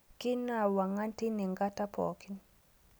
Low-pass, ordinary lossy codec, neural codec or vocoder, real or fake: none; none; none; real